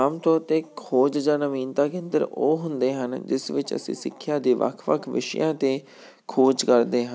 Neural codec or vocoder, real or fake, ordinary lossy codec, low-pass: none; real; none; none